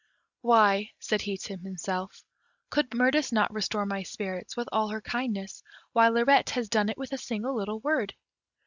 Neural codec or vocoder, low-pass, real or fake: none; 7.2 kHz; real